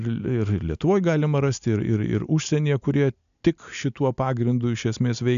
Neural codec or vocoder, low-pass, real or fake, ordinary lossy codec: none; 7.2 kHz; real; AAC, 96 kbps